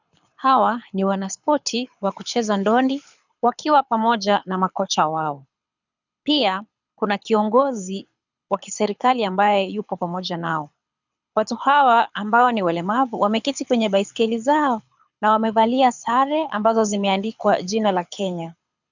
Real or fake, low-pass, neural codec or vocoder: fake; 7.2 kHz; codec, 24 kHz, 6 kbps, HILCodec